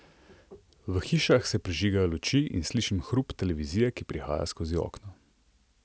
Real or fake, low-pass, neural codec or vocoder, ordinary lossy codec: real; none; none; none